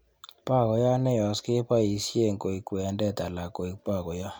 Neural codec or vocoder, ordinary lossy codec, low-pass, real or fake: none; none; none; real